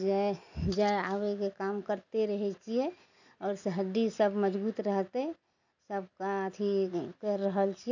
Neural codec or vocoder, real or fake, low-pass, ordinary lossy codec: none; real; 7.2 kHz; none